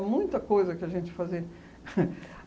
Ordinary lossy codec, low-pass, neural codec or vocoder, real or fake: none; none; none; real